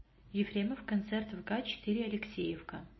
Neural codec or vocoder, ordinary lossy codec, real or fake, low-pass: none; MP3, 24 kbps; real; 7.2 kHz